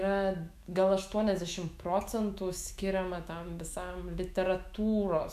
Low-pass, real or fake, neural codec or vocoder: 14.4 kHz; fake; autoencoder, 48 kHz, 128 numbers a frame, DAC-VAE, trained on Japanese speech